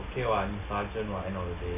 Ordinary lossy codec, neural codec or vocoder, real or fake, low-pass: MP3, 32 kbps; none; real; 3.6 kHz